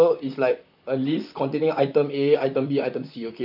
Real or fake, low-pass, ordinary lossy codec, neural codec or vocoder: fake; 5.4 kHz; none; vocoder, 44.1 kHz, 128 mel bands, Pupu-Vocoder